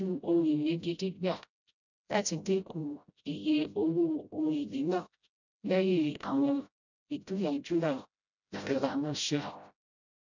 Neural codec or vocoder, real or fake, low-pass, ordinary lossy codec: codec, 16 kHz, 0.5 kbps, FreqCodec, smaller model; fake; 7.2 kHz; none